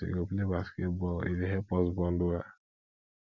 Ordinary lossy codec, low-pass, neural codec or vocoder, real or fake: none; 7.2 kHz; none; real